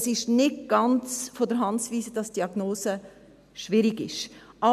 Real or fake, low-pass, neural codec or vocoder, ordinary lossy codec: real; 14.4 kHz; none; none